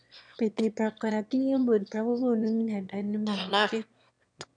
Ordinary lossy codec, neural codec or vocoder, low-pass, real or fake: none; autoencoder, 22.05 kHz, a latent of 192 numbers a frame, VITS, trained on one speaker; 9.9 kHz; fake